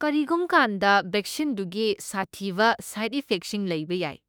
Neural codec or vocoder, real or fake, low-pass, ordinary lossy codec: autoencoder, 48 kHz, 32 numbers a frame, DAC-VAE, trained on Japanese speech; fake; none; none